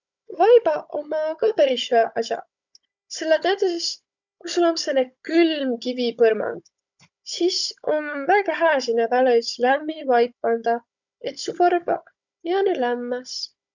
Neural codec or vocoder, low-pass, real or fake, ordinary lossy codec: codec, 16 kHz, 16 kbps, FunCodec, trained on Chinese and English, 50 frames a second; 7.2 kHz; fake; none